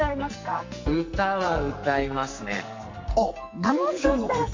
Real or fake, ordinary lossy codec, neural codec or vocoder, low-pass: fake; MP3, 48 kbps; codec, 44.1 kHz, 2.6 kbps, SNAC; 7.2 kHz